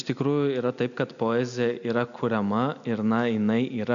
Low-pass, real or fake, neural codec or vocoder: 7.2 kHz; real; none